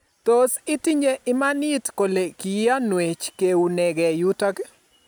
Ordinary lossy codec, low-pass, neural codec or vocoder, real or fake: none; none; none; real